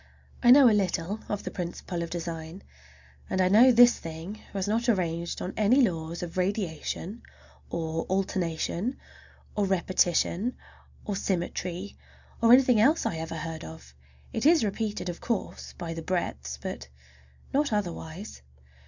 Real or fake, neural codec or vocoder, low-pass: real; none; 7.2 kHz